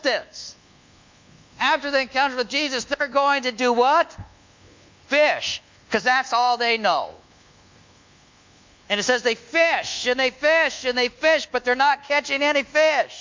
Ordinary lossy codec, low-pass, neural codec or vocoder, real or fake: MP3, 64 kbps; 7.2 kHz; codec, 24 kHz, 1.2 kbps, DualCodec; fake